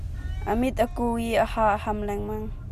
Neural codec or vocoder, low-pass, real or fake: none; 14.4 kHz; real